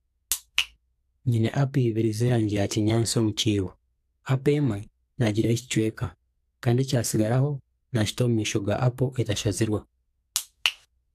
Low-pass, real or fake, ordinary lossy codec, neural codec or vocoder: 14.4 kHz; fake; AAC, 96 kbps; codec, 44.1 kHz, 2.6 kbps, SNAC